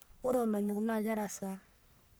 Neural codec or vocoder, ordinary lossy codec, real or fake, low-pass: codec, 44.1 kHz, 1.7 kbps, Pupu-Codec; none; fake; none